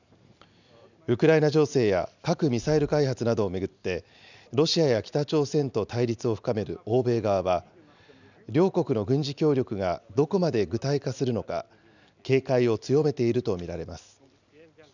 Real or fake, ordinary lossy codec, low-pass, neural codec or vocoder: real; none; 7.2 kHz; none